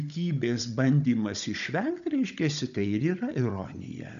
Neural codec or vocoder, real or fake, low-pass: codec, 16 kHz, 16 kbps, FunCodec, trained on Chinese and English, 50 frames a second; fake; 7.2 kHz